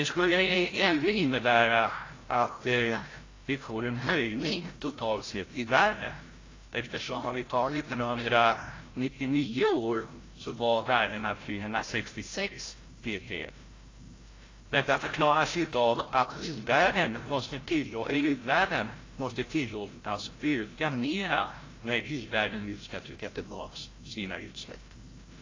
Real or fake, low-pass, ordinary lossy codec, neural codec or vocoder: fake; 7.2 kHz; AAC, 32 kbps; codec, 16 kHz, 0.5 kbps, FreqCodec, larger model